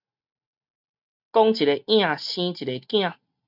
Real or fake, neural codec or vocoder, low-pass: real; none; 5.4 kHz